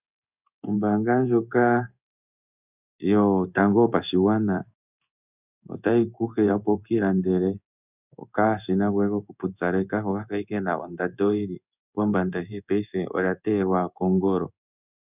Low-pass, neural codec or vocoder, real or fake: 3.6 kHz; codec, 16 kHz in and 24 kHz out, 1 kbps, XY-Tokenizer; fake